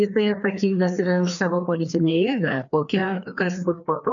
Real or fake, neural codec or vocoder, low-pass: fake; codec, 16 kHz, 2 kbps, FreqCodec, larger model; 7.2 kHz